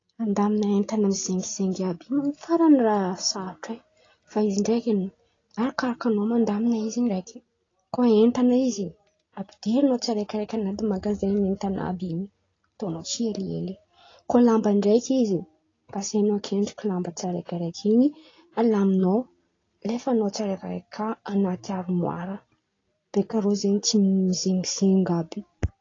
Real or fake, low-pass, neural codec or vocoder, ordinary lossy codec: real; 7.2 kHz; none; AAC, 32 kbps